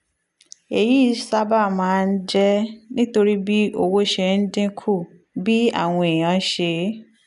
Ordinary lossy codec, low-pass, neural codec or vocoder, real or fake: none; 10.8 kHz; none; real